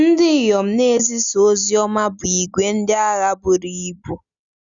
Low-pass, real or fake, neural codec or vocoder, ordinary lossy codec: 7.2 kHz; real; none; Opus, 64 kbps